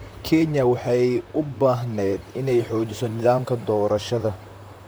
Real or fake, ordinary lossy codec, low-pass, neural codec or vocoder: fake; none; none; vocoder, 44.1 kHz, 128 mel bands, Pupu-Vocoder